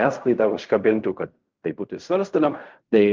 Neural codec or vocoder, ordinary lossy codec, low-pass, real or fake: codec, 16 kHz in and 24 kHz out, 0.4 kbps, LongCat-Audio-Codec, fine tuned four codebook decoder; Opus, 24 kbps; 7.2 kHz; fake